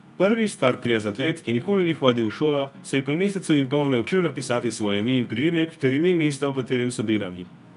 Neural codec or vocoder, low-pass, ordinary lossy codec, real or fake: codec, 24 kHz, 0.9 kbps, WavTokenizer, medium music audio release; 10.8 kHz; none; fake